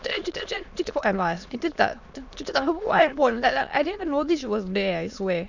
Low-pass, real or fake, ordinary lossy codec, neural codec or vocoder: 7.2 kHz; fake; none; autoencoder, 22.05 kHz, a latent of 192 numbers a frame, VITS, trained on many speakers